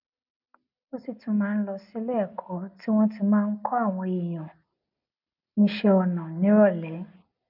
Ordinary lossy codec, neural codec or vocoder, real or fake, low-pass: none; none; real; 5.4 kHz